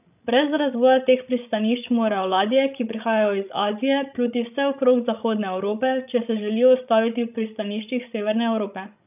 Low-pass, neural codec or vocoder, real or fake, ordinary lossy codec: 3.6 kHz; codec, 16 kHz, 16 kbps, FreqCodec, larger model; fake; none